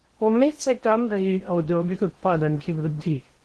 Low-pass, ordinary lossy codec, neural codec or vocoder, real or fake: 10.8 kHz; Opus, 16 kbps; codec, 16 kHz in and 24 kHz out, 0.8 kbps, FocalCodec, streaming, 65536 codes; fake